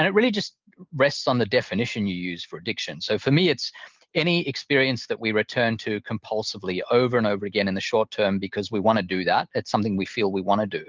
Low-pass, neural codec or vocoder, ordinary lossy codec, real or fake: 7.2 kHz; none; Opus, 32 kbps; real